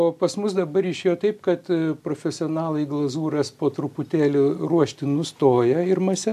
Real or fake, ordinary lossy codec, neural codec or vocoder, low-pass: real; AAC, 96 kbps; none; 14.4 kHz